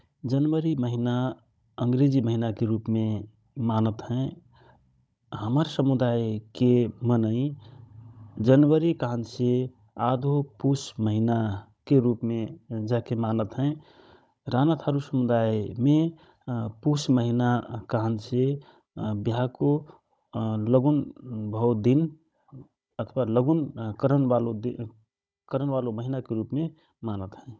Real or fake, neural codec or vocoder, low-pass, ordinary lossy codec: fake; codec, 16 kHz, 16 kbps, FunCodec, trained on Chinese and English, 50 frames a second; none; none